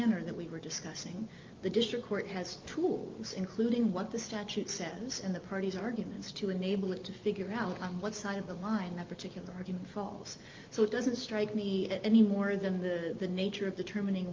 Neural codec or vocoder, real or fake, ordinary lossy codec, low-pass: none; real; Opus, 32 kbps; 7.2 kHz